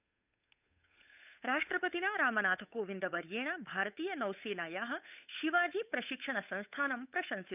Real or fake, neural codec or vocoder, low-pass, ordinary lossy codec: fake; codec, 16 kHz, 8 kbps, FunCodec, trained on Chinese and English, 25 frames a second; 3.6 kHz; none